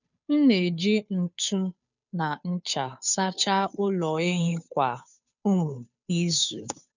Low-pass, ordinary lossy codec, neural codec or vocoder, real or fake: 7.2 kHz; none; codec, 16 kHz, 2 kbps, FunCodec, trained on Chinese and English, 25 frames a second; fake